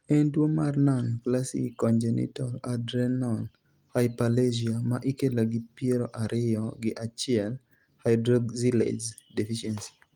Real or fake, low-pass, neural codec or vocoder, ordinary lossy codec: real; 19.8 kHz; none; Opus, 32 kbps